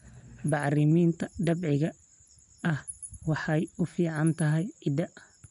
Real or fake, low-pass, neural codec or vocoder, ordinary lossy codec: real; 10.8 kHz; none; MP3, 96 kbps